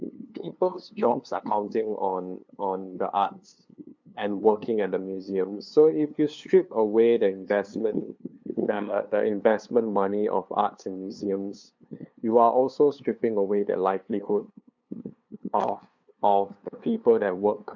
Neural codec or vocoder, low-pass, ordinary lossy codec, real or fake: codec, 16 kHz, 2 kbps, FunCodec, trained on LibriTTS, 25 frames a second; 7.2 kHz; MP3, 64 kbps; fake